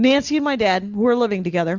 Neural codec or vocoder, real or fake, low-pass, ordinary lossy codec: none; real; 7.2 kHz; Opus, 64 kbps